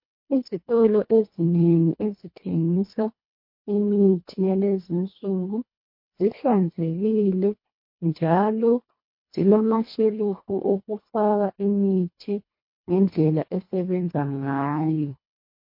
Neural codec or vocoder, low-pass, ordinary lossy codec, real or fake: codec, 24 kHz, 1.5 kbps, HILCodec; 5.4 kHz; MP3, 32 kbps; fake